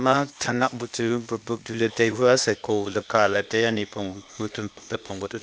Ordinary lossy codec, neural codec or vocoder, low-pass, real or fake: none; codec, 16 kHz, 0.8 kbps, ZipCodec; none; fake